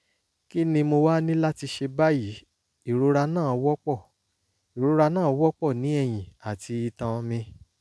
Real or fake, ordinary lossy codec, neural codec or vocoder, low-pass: real; none; none; none